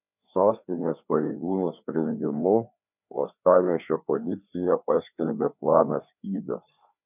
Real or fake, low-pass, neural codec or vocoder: fake; 3.6 kHz; codec, 16 kHz, 2 kbps, FreqCodec, larger model